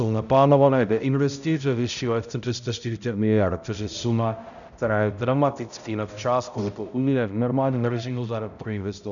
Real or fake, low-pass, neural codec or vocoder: fake; 7.2 kHz; codec, 16 kHz, 0.5 kbps, X-Codec, HuBERT features, trained on balanced general audio